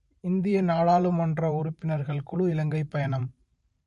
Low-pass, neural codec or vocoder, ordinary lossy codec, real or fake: 14.4 kHz; vocoder, 44.1 kHz, 128 mel bands every 512 samples, BigVGAN v2; MP3, 48 kbps; fake